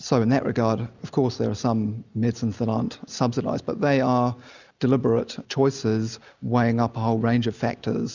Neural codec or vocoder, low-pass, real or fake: none; 7.2 kHz; real